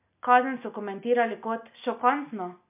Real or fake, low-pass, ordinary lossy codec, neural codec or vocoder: real; 3.6 kHz; MP3, 32 kbps; none